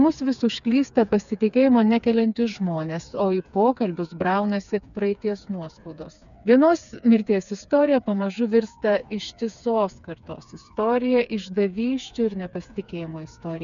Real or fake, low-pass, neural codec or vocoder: fake; 7.2 kHz; codec, 16 kHz, 4 kbps, FreqCodec, smaller model